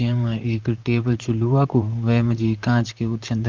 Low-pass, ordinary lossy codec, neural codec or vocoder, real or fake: 7.2 kHz; Opus, 24 kbps; vocoder, 44.1 kHz, 128 mel bands, Pupu-Vocoder; fake